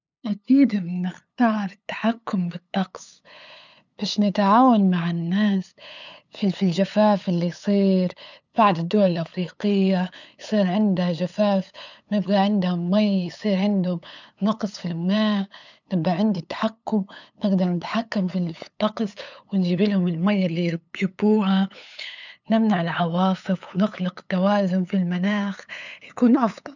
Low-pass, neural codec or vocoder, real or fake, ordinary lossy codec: 7.2 kHz; codec, 16 kHz, 8 kbps, FunCodec, trained on LibriTTS, 25 frames a second; fake; none